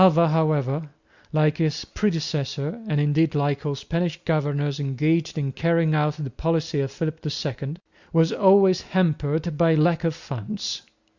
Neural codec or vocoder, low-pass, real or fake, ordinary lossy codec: none; 7.2 kHz; real; Opus, 64 kbps